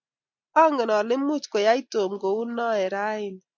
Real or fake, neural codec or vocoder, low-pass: real; none; 7.2 kHz